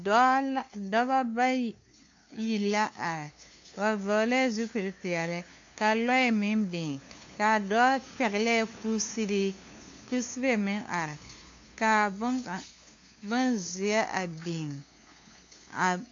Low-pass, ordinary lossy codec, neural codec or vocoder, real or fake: 7.2 kHz; AAC, 48 kbps; codec, 16 kHz, 2 kbps, FunCodec, trained on LibriTTS, 25 frames a second; fake